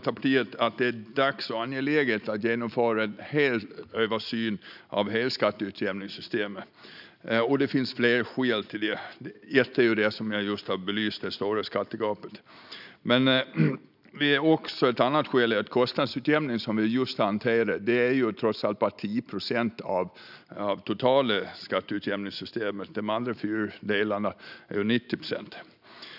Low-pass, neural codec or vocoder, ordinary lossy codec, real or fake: 5.4 kHz; none; none; real